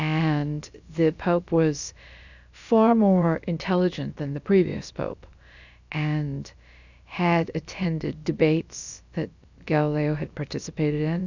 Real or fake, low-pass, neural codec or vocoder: fake; 7.2 kHz; codec, 16 kHz, about 1 kbps, DyCAST, with the encoder's durations